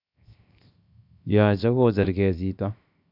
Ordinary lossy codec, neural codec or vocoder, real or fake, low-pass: none; codec, 16 kHz, 0.7 kbps, FocalCodec; fake; 5.4 kHz